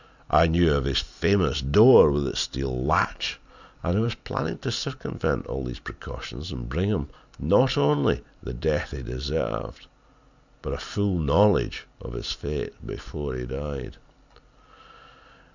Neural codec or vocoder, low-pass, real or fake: none; 7.2 kHz; real